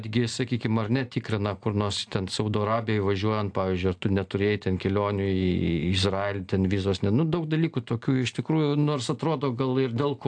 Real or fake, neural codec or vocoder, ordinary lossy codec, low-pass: real; none; AAC, 64 kbps; 9.9 kHz